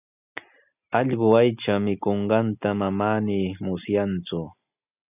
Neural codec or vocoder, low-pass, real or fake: none; 3.6 kHz; real